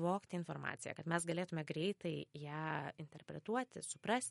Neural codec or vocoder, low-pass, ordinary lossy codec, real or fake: none; 19.8 kHz; MP3, 48 kbps; real